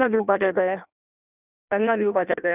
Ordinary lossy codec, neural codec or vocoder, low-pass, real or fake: none; codec, 16 kHz in and 24 kHz out, 0.6 kbps, FireRedTTS-2 codec; 3.6 kHz; fake